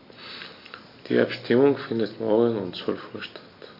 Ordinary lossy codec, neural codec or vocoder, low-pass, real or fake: AAC, 32 kbps; none; 5.4 kHz; real